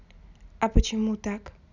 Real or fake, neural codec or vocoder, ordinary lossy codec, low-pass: real; none; none; 7.2 kHz